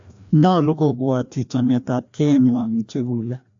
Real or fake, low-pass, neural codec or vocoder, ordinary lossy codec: fake; 7.2 kHz; codec, 16 kHz, 1 kbps, FreqCodec, larger model; AAC, 64 kbps